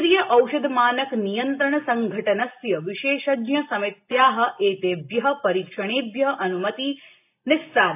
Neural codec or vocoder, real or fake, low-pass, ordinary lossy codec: none; real; 3.6 kHz; AAC, 32 kbps